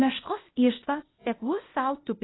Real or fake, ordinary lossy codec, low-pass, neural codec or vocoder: fake; AAC, 16 kbps; 7.2 kHz; codec, 16 kHz, 0.8 kbps, ZipCodec